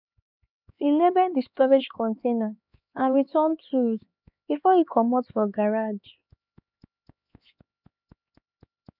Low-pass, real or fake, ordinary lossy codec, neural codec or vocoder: 5.4 kHz; fake; none; codec, 16 kHz, 4 kbps, X-Codec, HuBERT features, trained on LibriSpeech